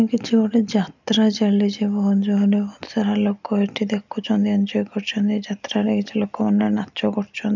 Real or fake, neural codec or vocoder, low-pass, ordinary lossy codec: real; none; 7.2 kHz; none